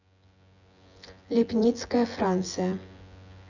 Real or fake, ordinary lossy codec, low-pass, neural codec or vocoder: fake; none; 7.2 kHz; vocoder, 24 kHz, 100 mel bands, Vocos